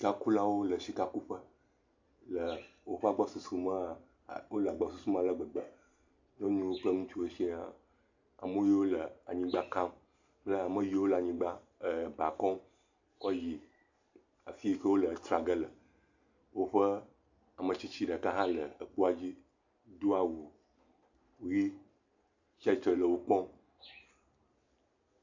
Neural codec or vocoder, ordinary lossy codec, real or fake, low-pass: none; AAC, 48 kbps; real; 7.2 kHz